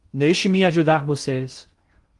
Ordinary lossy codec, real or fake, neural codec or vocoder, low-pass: Opus, 24 kbps; fake; codec, 16 kHz in and 24 kHz out, 0.6 kbps, FocalCodec, streaming, 2048 codes; 10.8 kHz